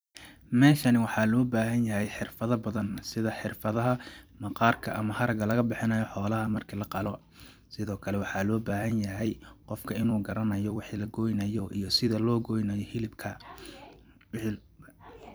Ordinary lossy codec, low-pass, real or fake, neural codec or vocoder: none; none; fake; vocoder, 44.1 kHz, 128 mel bands every 256 samples, BigVGAN v2